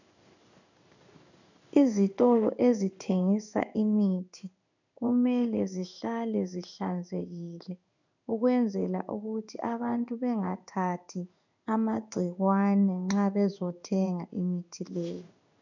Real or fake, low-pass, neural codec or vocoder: fake; 7.2 kHz; codec, 16 kHz in and 24 kHz out, 1 kbps, XY-Tokenizer